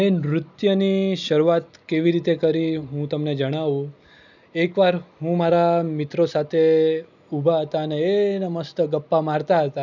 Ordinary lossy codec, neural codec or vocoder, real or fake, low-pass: none; none; real; 7.2 kHz